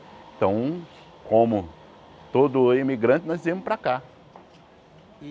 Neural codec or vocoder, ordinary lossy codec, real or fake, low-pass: none; none; real; none